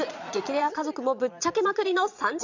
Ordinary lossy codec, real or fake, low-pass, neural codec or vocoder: none; fake; 7.2 kHz; vocoder, 22.05 kHz, 80 mel bands, Vocos